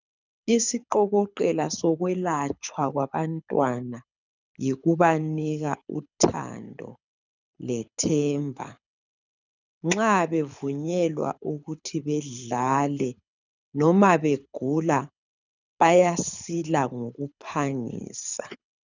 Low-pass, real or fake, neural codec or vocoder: 7.2 kHz; fake; codec, 24 kHz, 6 kbps, HILCodec